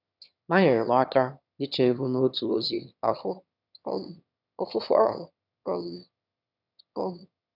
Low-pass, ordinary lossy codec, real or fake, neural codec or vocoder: 5.4 kHz; none; fake; autoencoder, 22.05 kHz, a latent of 192 numbers a frame, VITS, trained on one speaker